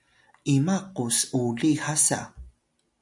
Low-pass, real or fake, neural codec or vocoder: 10.8 kHz; real; none